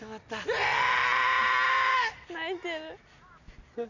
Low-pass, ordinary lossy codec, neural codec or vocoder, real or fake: 7.2 kHz; none; none; real